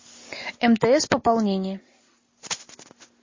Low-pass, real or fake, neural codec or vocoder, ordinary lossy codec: 7.2 kHz; real; none; MP3, 32 kbps